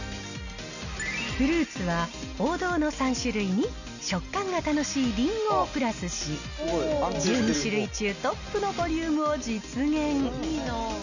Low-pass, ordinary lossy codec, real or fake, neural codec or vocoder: 7.2 kHz; none; real; none